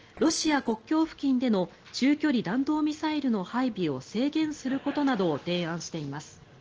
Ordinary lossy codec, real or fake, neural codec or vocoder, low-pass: Opus, 16 kbps; real; none; 7.2 kHz